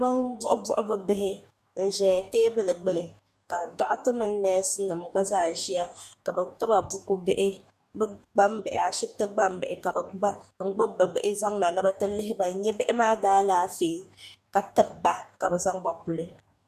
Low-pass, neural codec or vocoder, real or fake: 14.4 kHz; codec, 44.1 kHz, 2.6 kbps, DAC; fake